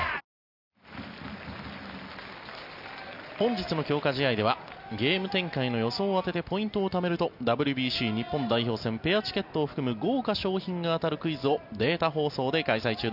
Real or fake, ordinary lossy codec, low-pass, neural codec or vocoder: real; none; 5.4 kHz; none